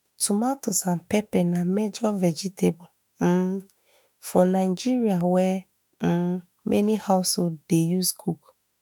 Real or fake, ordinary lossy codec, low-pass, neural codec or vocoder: fake; none; none; autoencoder, 48 kHz, 32 numbers a frame, DAC-VAE, trained on Japanese speech